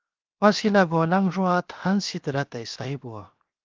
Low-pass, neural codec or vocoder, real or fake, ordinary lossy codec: 7.2 kHz; codec, 16 kHz, 0.7 kbps, FocalCodec; fake; Opus, 24 kbps